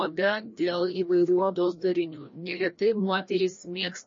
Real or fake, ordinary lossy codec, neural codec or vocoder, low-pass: fake; MP3, 32 kbps; codec, 16 kHz, 1 kbps, FreqCodec, larger model; 7.2 kHz